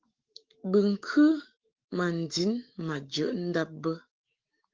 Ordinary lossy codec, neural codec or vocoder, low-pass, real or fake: Opus, 16 kbps; autoencoder, 48 kHz, 128 numbers a frame, DAC-VAE, trained on Japanese speech; 7.2 kHz; fake